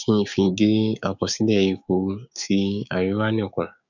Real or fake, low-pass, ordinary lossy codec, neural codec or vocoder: fake; 7.2 kHz; none; codec, 16 kHz, 6 kbps, DAC